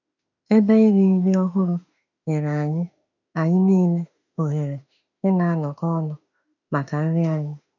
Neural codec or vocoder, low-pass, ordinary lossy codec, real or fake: autoencoder, 48 kHz, 32 numbers a frame, DAC-VAE, trained on Japanese speech; 7.2 kHz; none; fake